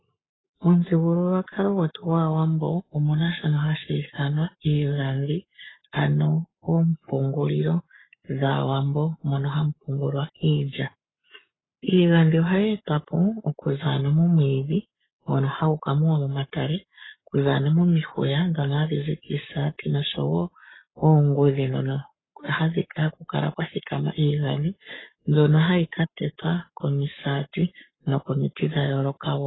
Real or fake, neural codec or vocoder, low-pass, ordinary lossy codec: fake; codec, 44.1 kHz, 7.8 kbps, Pupu-Codec; 7.2 kHz; AAC, 16 kbps